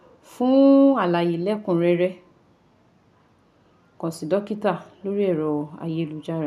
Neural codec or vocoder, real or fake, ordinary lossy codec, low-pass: none; real; none; 14.4 kHz